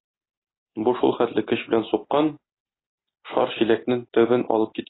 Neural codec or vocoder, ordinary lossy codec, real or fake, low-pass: none; AAC, 16 kbps; real; 7.2 kHz